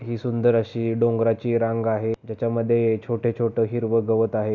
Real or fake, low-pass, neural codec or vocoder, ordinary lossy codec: real; 7.2 kHz; none; none